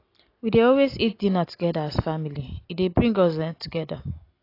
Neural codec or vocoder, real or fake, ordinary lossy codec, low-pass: none; real; AAC, 32 kbps; 5.4 kHz